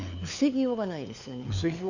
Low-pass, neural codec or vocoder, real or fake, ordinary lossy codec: 7.2 kHz; codec, 16 kHz, 4 kbps, FunCodec, trained on LibriTTS, 50 frames a second; fake; none